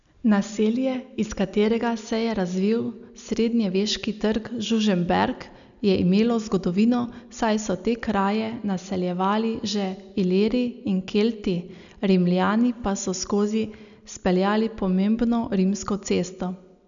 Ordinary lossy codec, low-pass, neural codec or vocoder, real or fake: none; 7.2 kHz; none; real